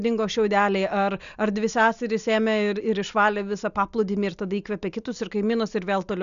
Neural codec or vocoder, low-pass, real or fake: none; 7.2 kHz; real